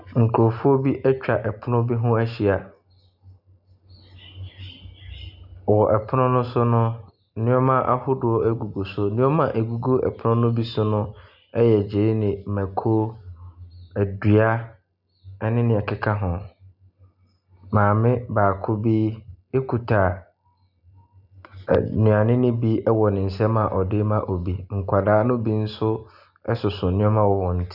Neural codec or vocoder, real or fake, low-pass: none; real; 5.4 kHz